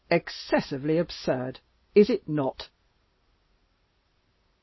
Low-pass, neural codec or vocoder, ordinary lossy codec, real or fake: 7.2 kHz; none; MP3, 24 kbps; real